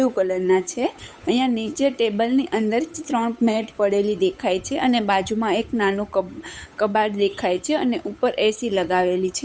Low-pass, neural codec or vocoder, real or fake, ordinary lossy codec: none; codec, 16 kHz, 8 kbps, FunCodec, trained on Chinese and English, 25 frames a second; fake; none